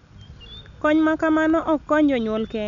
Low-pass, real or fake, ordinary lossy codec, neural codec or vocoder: 7.2 kHz; real; MP3, 96 kbps; none